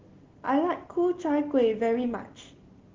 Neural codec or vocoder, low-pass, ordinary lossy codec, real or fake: none; 7.2 kHz; Opus, 16 kbps; real